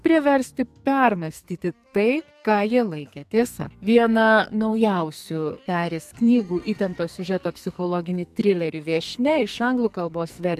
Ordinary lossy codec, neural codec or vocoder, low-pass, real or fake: AAC, 96 kbps; codec, 44.1 kHz, 2.6 kbps, SNAC; 14.4 kHz; fake